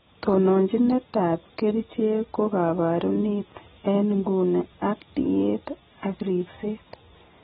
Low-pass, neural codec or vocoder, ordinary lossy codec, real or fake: 9.9 kHz; none; AAC, 16 kbps; real